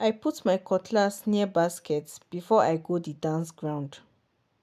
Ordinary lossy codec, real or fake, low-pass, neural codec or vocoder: none; real; 14.4 kHz; none